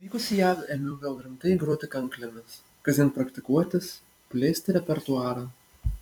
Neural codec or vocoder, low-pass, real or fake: vocoder, 44.1 kHz, 128 mel bands every 256 samples, BigVGAN v2; 19.8 kHz; fake